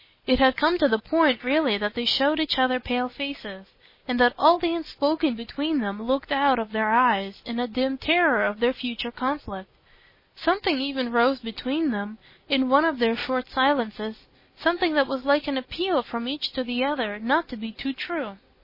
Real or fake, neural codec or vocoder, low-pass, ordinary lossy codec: real; none; 5.4 kHz; MP3, 24 kbps